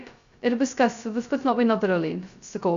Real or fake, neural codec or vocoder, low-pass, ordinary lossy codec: fake; codec, 16 kHz, 0.2 kbps, FocalCodec; 7.2 kHz; Opus, 64 kbps